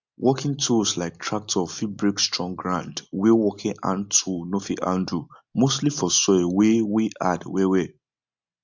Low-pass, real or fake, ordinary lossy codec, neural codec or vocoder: 7.2 kHz; real; MP3, 64 kbps; none